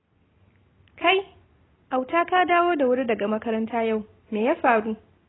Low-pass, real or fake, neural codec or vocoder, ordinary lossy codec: 7.2 kHz; real; none; AAC, 16 kbps